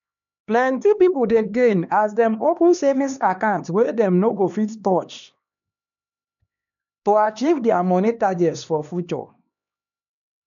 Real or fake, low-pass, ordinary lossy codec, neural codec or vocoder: fake; 7.2 kHz; none; codec, 16 kHz, 2 kbps, X-Codec, HuBERT features, trained on LibriSpeech